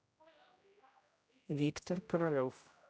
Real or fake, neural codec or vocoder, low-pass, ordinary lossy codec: fake; codec, 16 kHz, 0.5 kbps, X-Codec, HuBERT features, trained on general audio; none; none